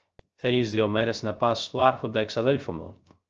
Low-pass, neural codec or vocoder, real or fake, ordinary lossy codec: 7.2 kHz; codec, 16 kHz, 0.3 kbps, FocalCodec; fake; Opus, 24 kbps